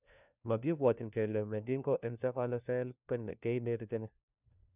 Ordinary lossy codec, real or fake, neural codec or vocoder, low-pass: none; fake; codec, 16 kHz, 1 kbps, FunCodec, trained on LibriTTS, 50 frames a second; 3.6 kHz